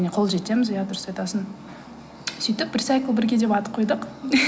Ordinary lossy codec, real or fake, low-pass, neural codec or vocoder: none; real; none; none